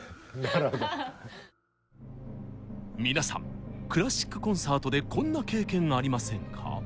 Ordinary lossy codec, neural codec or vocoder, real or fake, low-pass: none; none; real; none